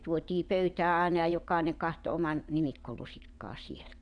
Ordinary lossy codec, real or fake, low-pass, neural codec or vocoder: none; fake; 9.9 kHz; vocoder, 22.05 kHz, 80 mel bands, WaveNeXt